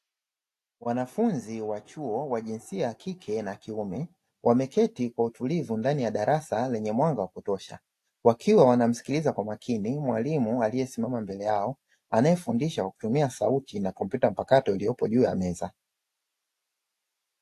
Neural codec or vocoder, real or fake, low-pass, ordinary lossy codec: none; real; 14.4 kHz; AAC, 64 kbps